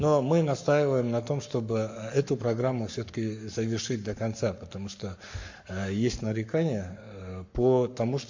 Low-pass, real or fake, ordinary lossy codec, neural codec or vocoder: 7.2 kHz; fake; MP3, 48 kbps; codec, 44.1 kHz, 7.8 kbps, Pupu-Codec